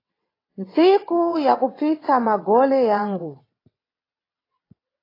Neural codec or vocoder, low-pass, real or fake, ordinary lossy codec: vocoder, 22.05 kHz, 80 mel bands, WaveNeXt; 5.4 kHz; fake; AAC, 24 kbps